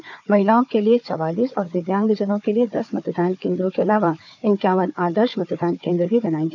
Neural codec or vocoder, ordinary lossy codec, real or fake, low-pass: codec, 16 kHz, 4 kbps, FunCodec, trained on Chinese and English, 50 frames a second; none; fake; 7.2 kHz